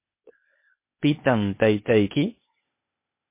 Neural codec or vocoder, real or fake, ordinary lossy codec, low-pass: codec, 16 kHz, 0.8 kbps, ZipCodec; fake; MP3, 24 kbps; 3.6 kHz